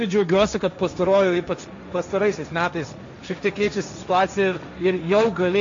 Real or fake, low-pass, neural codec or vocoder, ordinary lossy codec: fake; 7.2 kHz; codec, 16 kHz, 1.1 kbps, Voila-Tokenizer; MP3, 64 kbps